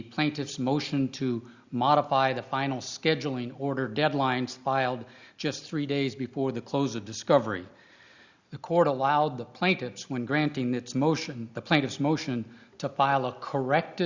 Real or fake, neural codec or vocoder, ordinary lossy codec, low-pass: real; none; Opus, 64 kbps; 7.2 kHz